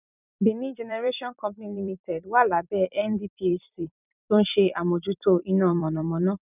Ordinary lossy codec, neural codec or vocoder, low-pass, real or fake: none; vocoder, 44.1 kHz, 128 mel bands every 256 samples, BigVGAN v2; 3.6 kHz; fake